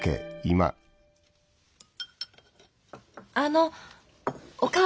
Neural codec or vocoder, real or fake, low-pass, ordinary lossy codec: none; real; none; none